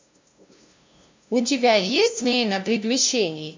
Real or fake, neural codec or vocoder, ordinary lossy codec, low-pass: fake; codec, 16 kHz, 0.5 kbps, FunCodec, trained on LibriTTS, 25 frames a second; MP3, 64 kbps; 7.2 kHz